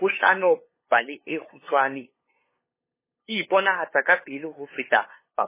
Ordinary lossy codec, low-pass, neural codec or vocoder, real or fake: MP3, 16 kbps; 3.6 kHz; codec, 16 kHz, 2 kbps, FunCodec, trained on LibriTTS, 25 frames a second; fake